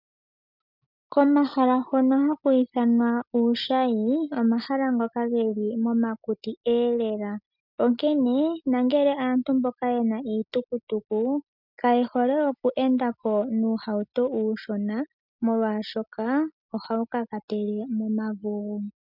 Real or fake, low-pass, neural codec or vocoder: real; 5.4 kHz; none